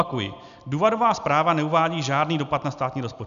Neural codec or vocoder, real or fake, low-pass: none; real; 7.2 kHz